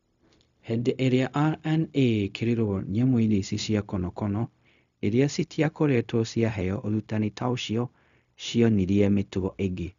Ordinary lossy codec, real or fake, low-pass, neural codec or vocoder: none; fake; 7.2 kHz; codec, 16 kHz, 0.4 kbps, LongCat-Audio-Codec